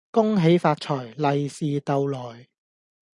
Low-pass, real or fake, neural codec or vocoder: 10.8 kHz; real; none